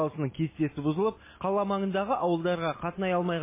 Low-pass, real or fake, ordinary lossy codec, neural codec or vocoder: 3.6 kHz; real; MP3, 16 kbps; none